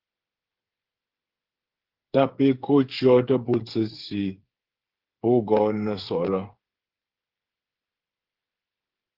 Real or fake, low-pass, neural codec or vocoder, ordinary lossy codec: fake; 5.4 kHz; codec, 16 kHz, 8 kbps, FreqCodec, smaller model; Opus, 16 kbps